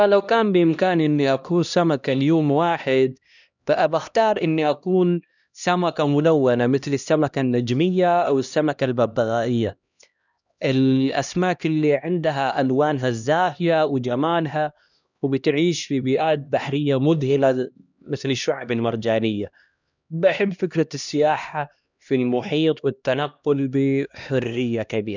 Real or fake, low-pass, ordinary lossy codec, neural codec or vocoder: fake; 7.2 kHz; none; codec, 16 kHz, 1 kbps, X-Codec, HuBERT features, trained on LibriSpeech